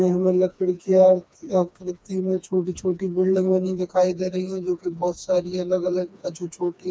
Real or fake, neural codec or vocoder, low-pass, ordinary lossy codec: fake; codec, 16 kHz, 2 kbps, FreqCodec, smaller model; none; none